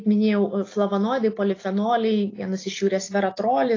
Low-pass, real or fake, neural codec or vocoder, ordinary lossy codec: 7.2 kHz; real; none; AAC, 32 kbps